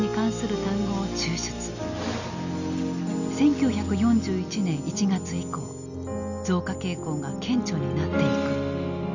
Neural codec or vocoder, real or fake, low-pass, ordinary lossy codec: none; real; 7.2 kHz; none